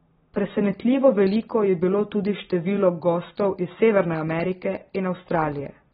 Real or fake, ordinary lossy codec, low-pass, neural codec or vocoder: real; AAC, 16 kbps; 19.8 kHz; none